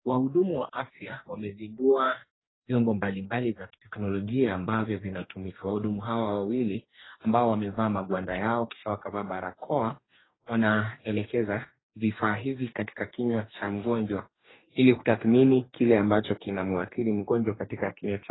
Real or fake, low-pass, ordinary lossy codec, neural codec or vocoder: fake; 7.2 kHz; AAC, 16 kbps; codec, 44.1 kHz, 2.6 kbps, DAC